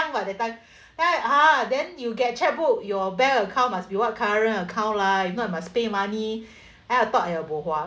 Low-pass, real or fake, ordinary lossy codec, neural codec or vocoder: none; real; none; none